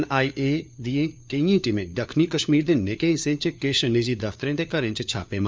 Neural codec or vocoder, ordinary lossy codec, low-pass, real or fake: codec, 16 kHz, 4 kbps, FunCodec, trained on LibriTTS, 50 frames a second; none; none; fake